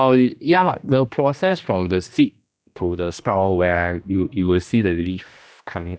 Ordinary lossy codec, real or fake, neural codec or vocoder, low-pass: none; fake; codec, 16 kHz, 1 kbps, X-Codec, HuBERT features, trained on general audio; none